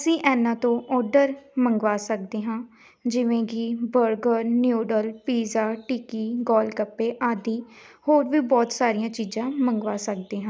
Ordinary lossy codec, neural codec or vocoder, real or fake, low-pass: none; none; real; none